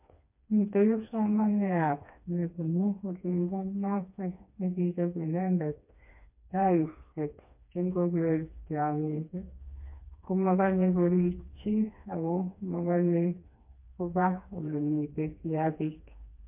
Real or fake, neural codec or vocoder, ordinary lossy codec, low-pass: fake; codec, 16 kHz, 2 kbps, FreqCodec, smaller model; MP3, 32 kbps; 3.6 kHz